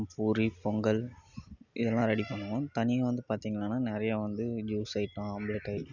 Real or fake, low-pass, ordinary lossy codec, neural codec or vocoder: real; 7.2 kHz; none; none